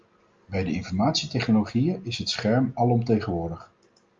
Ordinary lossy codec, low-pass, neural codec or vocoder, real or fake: Opus, 32 kbps; 7.2 kHz; none; real